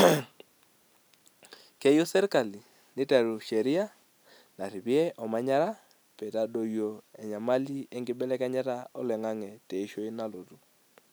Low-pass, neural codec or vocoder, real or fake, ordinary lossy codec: none; none; real; none